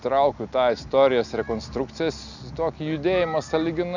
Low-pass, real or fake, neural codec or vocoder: 7.2 kHz; real; none